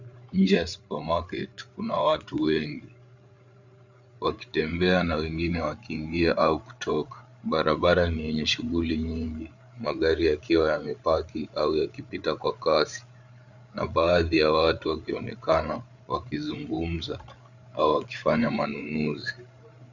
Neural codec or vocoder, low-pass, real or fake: codec, 16 kHz, 8 kbps, FreqCodec, larger model; 7.2 kHz; fake